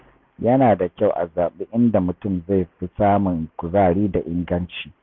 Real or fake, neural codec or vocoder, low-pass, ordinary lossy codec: real; none; none; none